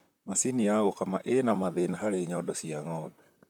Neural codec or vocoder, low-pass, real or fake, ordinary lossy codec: vocoder, 44.1 kHz, 128 mel bands, Pupu-Vocoder; 19.8 kHz; fake; none